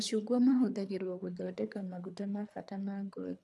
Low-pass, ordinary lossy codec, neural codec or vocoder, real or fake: none; none; codec, 24 kHz, 3 kbps, HILCodec; fake